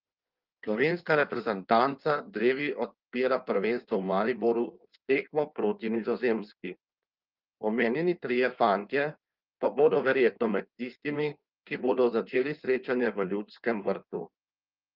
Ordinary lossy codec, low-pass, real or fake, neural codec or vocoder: Opus, 24 kbps; 5.4 kHz; fake; codec, 16 kHz in and 24 kHz out, 1.1 kbps, FireRedTTS-2 codec